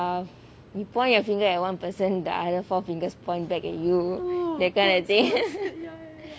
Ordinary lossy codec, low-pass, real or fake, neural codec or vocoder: none; none; real; none